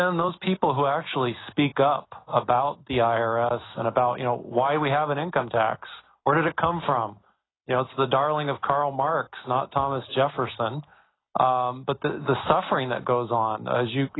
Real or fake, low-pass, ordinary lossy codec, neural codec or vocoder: real; 7.2 kHz; AAC, 16 kbps; none